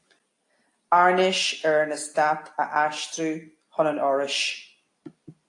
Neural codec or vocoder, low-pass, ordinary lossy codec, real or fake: none; 10.8 kHz; AAC, 48 kbps; real